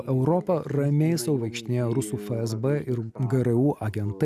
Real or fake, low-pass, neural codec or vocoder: real; 14.4 kHz; none